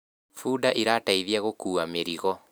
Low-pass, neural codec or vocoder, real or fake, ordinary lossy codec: none; none; real; none